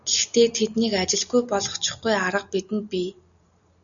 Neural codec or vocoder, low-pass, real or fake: none; 7.2 kHz; real